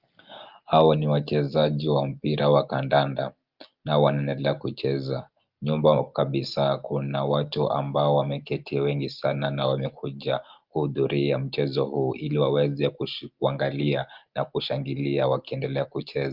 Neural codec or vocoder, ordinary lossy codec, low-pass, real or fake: none; Opus, 32 kbps; 5.4 kHz; real